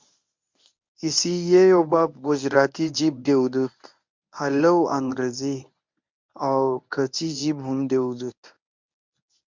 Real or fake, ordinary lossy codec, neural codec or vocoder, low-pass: fake; MP3, 64 kbps; codec, 24 kHz, 0.9 kbps, WavTokenizer, medium speech release version 1; 7.2 kHz